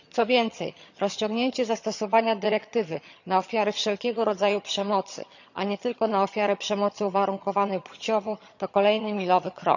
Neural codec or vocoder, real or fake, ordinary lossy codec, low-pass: vocoder, 22.05 kHz, 80 mel bands, HiFi-GAN; fake; none; 7.2 kHz